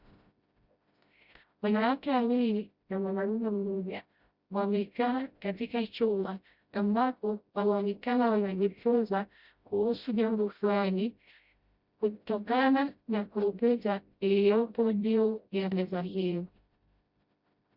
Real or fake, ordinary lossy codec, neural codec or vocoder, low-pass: fake; Opus, 64 kbps; codec, 16 kHz, 0.5 kbps, FreqCodec, smaller model; 5.4 kHz